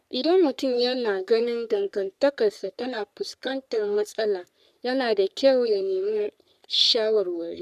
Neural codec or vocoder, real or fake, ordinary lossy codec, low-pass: codec, 44.1 kHz, 3.4 kbps, Pupu-Codec; fake; none; 14.4 kHz